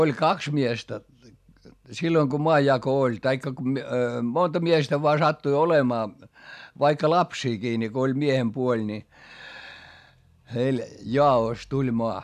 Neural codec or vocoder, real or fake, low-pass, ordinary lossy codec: none; real; 14.4 kHz; none